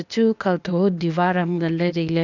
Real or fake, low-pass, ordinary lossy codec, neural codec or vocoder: fake; 7.2 kHz; none; codec, 16 kHz, 0.8 kbps, ZipCodec